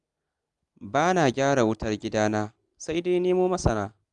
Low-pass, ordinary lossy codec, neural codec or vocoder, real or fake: 10.8 kHz; Opus, 32 kbps; none; real